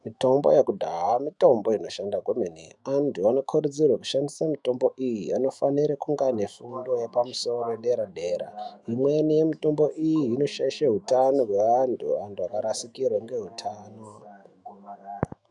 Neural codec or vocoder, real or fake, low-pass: autoencoder, 48 kHz, 128 numbers a frame, DAC-VAE, trained on Japanese speech; fake; 10.8 kHz